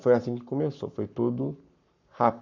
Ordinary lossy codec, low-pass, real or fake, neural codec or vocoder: none; 7.2 kHz; fake; codec, 44.1 kHz, 7.8 kbps, Pupu-Codec